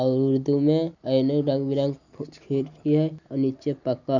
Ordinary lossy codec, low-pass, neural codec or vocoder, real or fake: none; 7.2 kHz; none; real